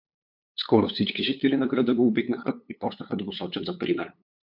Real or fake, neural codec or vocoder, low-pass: fake; codec, 16 kHz, 8 kbps, FunCodec, trained on LibriTTS, 25 frames a second; 5.4 kHz